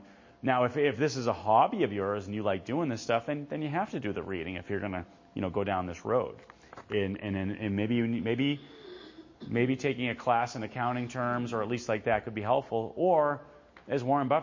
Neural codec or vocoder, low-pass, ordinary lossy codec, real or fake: none; 7.2 kHz; MP3, 32 kbps; real